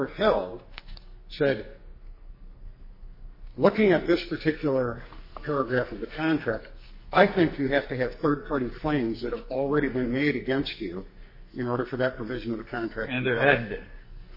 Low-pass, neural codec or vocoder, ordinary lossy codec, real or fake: 5.4 kHz; codec, 44.1 kHz, 2.6 kbps, SNAC; MP3, 24 kbps; fake